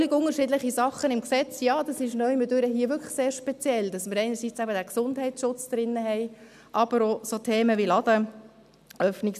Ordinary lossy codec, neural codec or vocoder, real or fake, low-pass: none; none; real; 14.4 kHz